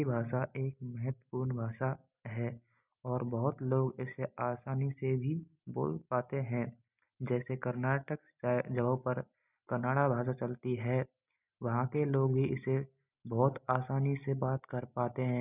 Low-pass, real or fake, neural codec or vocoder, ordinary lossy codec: 3.6 kHz; real; none; none